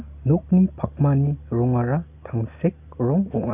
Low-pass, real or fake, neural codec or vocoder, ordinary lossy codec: 3.6 kHz; real; none; none